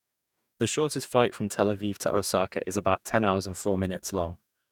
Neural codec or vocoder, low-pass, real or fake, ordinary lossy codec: codec, 44.1 kHz, 2.6 kbps, DAC; 19.8 kHz; fake; none